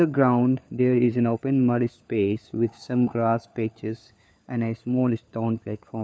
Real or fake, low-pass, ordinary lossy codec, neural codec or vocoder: fake; none; none; codec, 16 kHz, 4 kbps, FunCodec, trained on LibriTTS, 50 frames a second